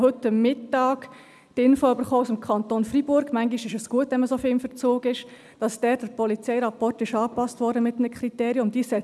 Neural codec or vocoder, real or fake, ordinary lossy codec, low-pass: none; real; none; none